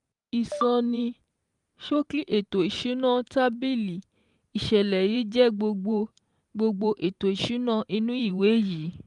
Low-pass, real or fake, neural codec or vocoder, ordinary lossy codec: 10.8 kHz; fake; vocoder, 44.1 kHz, 128 mel bands every 512 samples, BigVGAN v2; Opus, 32 kbps